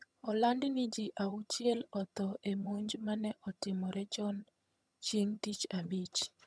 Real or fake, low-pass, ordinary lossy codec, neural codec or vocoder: fake; none; none; vocoder, 22.05 kHz, 80 mel bands, HiFi-GAN